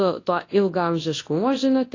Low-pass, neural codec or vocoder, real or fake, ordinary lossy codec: 7.2 kHz; codec, 24 kHz, 0.9 kbps, WavTokenizer, large speech release; fake; AAC, 32 kbps